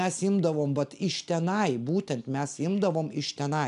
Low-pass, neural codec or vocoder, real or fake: 10.8 kHz; none; real